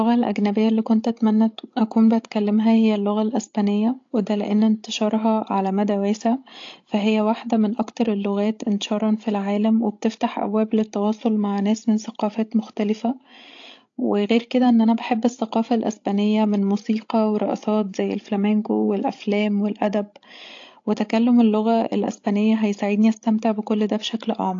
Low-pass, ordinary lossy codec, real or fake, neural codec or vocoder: 7.2 kHz; AAC, 48 kbps; fake; codec, 16 kHz, 16 kbps, FreqCodec, larger model